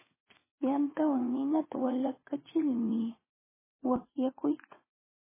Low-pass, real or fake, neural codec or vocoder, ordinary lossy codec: 3.6 kHz; fake; vocoder, 22.05 kHz, 80 mel bands, WaveNeXt; MP3, 16 kbps